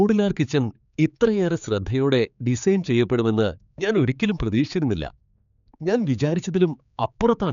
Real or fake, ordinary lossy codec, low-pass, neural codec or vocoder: fake; none; 7.2 kHz; codec, 16 kHz, 4 kbps, X-Codec, HuBERT features, trained on general audio